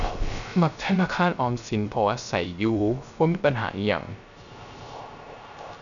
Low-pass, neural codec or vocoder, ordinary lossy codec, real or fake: 7.2 kHz; codec, 16 kHz, 0.3 kbps, FocalCodec; none; fake